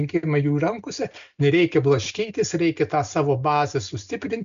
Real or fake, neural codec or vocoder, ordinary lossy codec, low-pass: real; none; MP3, 64 kbps; 7.2 kHz